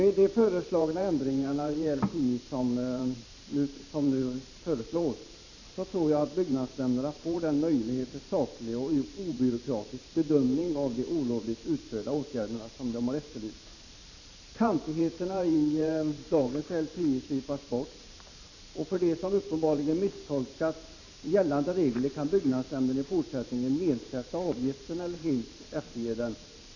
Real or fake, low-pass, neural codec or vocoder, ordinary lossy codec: fake; 7.2 kHz; vocoder, 44.1 kHz, 128 mel bands every 512 samples, BigVGAN v2; none